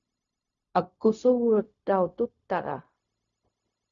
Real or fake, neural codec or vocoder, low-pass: fake; codec, 16 kHz, 0.4 kbps, LongCat-Audio-Codec; 7.2 kHz